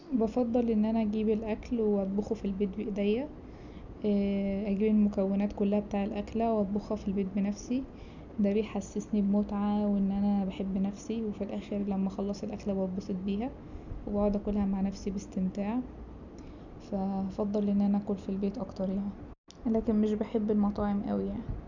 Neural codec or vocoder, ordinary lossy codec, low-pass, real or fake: none; none; 7.2 kHz; real